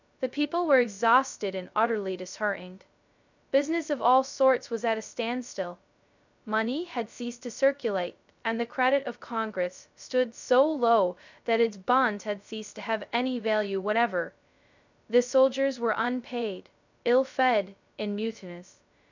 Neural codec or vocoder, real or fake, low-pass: codec, 16 kHz, 0.2 kbps, FocalCodec; fake; 7.2 kHz